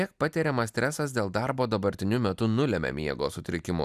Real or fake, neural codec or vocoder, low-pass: real; none; 14.4 kHz